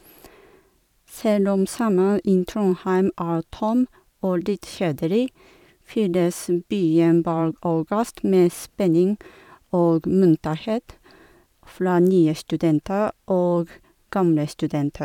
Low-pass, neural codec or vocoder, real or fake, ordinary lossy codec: 19.8 kHz; vocoder, 44.1 kHz, 128 mel bands every 512 samples, BigVGAN v2; fake; none